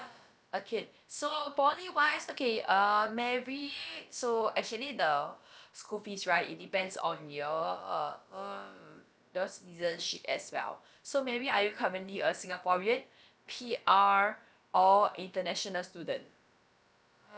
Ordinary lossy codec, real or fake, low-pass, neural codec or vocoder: none; fake; none; codec, 16 kHz, about 1 kbps, DyCAST, with the encoder's durations